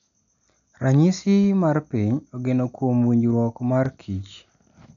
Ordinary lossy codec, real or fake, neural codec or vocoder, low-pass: none; real; none; 7.2 kHz